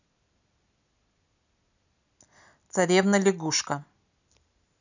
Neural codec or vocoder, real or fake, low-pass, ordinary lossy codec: none; real; 7.2 kHz; none